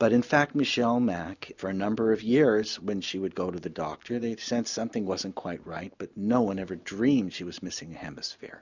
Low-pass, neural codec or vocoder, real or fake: 7.2 kHz; none; real